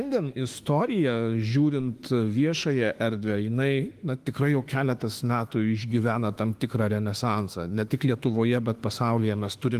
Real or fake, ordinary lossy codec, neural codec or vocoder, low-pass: fake; Opus, 24 kbps; autoencoder, 48 kHz, 32 numbers a frame, DAC-VAE, trained on Japanese speech; 14.4 kHz